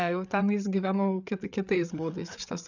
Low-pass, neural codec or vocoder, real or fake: 7.2 kHz; codec, 16 kHz, 8 kbps, FreqCodec, larger model; fake